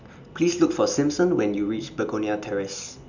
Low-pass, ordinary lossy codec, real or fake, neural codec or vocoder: 7.2 kHz; none; real; none